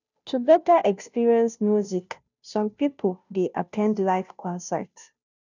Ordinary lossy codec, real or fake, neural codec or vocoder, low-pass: none; fake; codec, 16 kHz, 0.5 kbps, FunCodec, trained on Chinese and English, 25 frames a second; 7.2 kHz